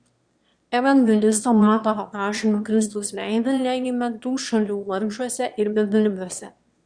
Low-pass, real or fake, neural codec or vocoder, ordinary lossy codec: 9.9 kHz; fake; autoencoder, 22.05 kHz, a latent of 192 numbers a frame, VITS, trained on one speaker; Opus, 64 kbps